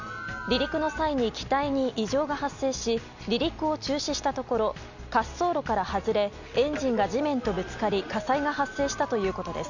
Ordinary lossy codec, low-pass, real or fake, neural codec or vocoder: none; 7.2 kHz; real; none